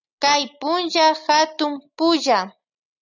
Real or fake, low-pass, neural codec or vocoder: real; 7.2 kHz; none